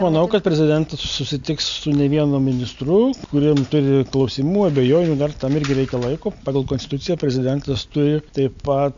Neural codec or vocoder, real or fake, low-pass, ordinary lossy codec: none; real; 7.2 kHz; AAC, 64 kbps